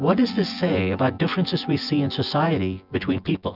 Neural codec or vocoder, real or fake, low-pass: vocoder, 24 kHz, 100 mel bands, Vocos; fake; 5.4 kHz